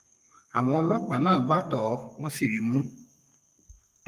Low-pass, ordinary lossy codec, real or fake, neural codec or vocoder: 14.4 kHz; Opus, 32 kbps; fake; codec, 32 kHz, 1.9 kbps, SNAC